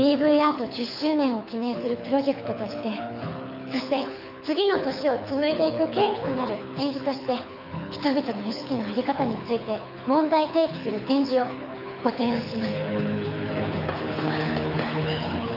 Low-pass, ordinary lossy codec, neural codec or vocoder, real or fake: 5.4 kHz; none; codec, 24 kHz, 6 kbps, HILCodec; fake